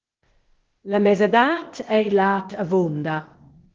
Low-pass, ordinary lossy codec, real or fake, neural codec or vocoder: 7.2 kHz; Opus, 16 kbps; fake; codec, 16 kHz, 0.8 kbps, ZipCodec